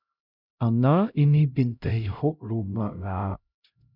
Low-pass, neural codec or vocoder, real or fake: 5.4 kHz; codec, 16 kHz, 0.5 kbps, X-Codec, HuBERT features, trained on LibriSpeech; fake